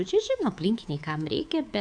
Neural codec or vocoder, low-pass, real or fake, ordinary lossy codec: codec, 24 kHz, 3.1 kbps, DualCodec; 9.9 kHz; fake; AAC, 64 kbps